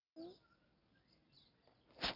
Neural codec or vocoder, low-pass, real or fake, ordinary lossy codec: none; 5.4 kHz; real; none